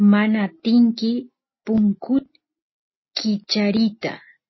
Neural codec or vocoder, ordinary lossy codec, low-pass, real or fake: none; MP3, 24 kbps; 7.2 kHz; real